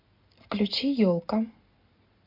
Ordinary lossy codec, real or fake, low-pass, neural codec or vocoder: MP3, 48 kbps; real; 5.4 kHz; none